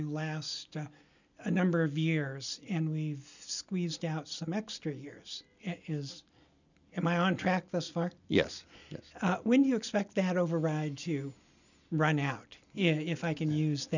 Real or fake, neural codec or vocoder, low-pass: real; none; 7.2 kHz